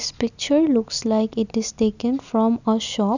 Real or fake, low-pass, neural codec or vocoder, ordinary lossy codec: real; 7.2 kHz; none; none